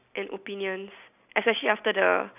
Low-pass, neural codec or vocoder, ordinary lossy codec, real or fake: 3.6 kHz; none; none; real